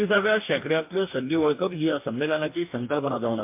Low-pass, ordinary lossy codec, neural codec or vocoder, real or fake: 3.6 kHz; MP3, 32 kbps; codec, 44.1 kHz, 2.6 kbps, DAC; fake